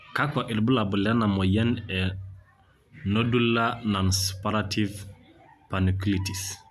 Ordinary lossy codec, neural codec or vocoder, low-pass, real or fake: AAC, 96 kbps; none; 14.4 kHz; real